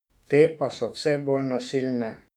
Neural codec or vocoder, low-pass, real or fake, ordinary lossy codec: autoencoder, 48 kHz, 32 numbers a frame, DAC-VAE, trained on Japanese speech; 19.8 kHz; fake; none